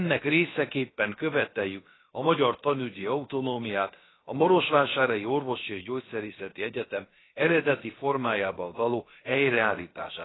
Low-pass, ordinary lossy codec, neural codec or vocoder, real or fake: 7.2 kHz; AAC, 16 kbps; codec, 16 kHz, about 1 kbps, DyCAST, with the encoder's durations; fake